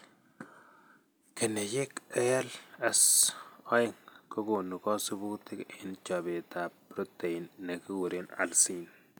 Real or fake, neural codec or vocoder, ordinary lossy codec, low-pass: real; none; none; none